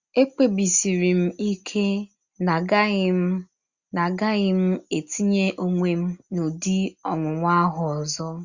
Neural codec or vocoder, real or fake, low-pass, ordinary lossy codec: none; real; 7.2 kHz; Opus, 64 kbps